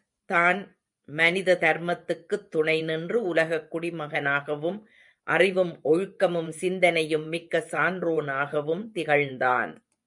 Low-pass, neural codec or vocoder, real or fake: 10.8 kHz; vocoder, 24 kHz, 100 mel bands, Vocos; fake